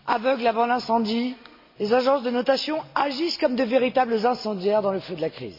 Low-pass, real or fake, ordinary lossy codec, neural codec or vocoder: 5.4 kHz; real; MP3, 32 kbps; none